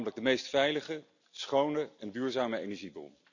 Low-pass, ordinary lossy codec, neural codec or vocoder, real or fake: 7.2 kHz; none; none; real